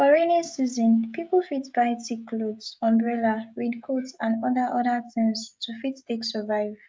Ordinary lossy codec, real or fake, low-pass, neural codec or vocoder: none; fake; none; codec, 16 kHz, 16 kbps, FreqCodec, smaller model